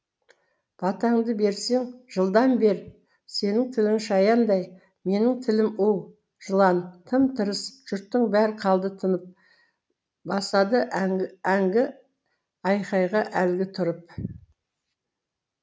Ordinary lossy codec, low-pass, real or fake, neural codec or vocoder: none; none; real; none